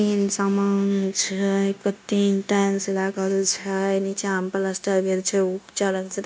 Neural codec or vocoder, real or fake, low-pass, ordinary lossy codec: codec, 16 kHz, 0.9 kbps, LongCat-Audio-Codec; fake; none; none